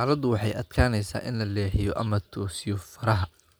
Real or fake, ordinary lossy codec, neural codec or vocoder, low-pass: real; none; none; none